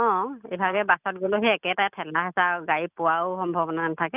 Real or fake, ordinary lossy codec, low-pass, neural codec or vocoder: real; none; 3.6 kHz; none